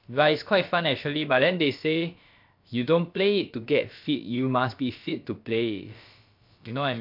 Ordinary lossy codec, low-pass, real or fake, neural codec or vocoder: MP3, 48 kbps; 5.4 kHz; fake; codec, 16 kHz, about 1 kbps, DyCAST, with the encoder's durations